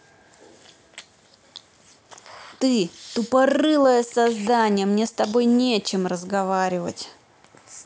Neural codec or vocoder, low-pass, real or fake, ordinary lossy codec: none; none; real; none